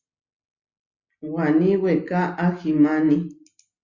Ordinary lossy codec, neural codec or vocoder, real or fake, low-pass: Opus, 64 kbps; none; real; 7.2 kHz